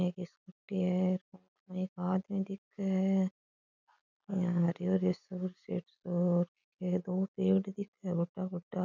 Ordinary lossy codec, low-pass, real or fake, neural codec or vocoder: none; 7.2 kHz; real; none